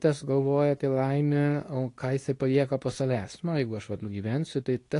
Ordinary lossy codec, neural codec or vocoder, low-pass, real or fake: AAC, 48 kbps; codec, 24 kHz, 0.9 kbps, WavTokenizer, medium speech release version 2; 10.8 kHz; fake